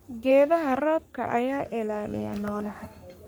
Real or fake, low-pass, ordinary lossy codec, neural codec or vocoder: fake; none; none; codec, 44.1 kHz, 3.4 kbps, Pupu-Codec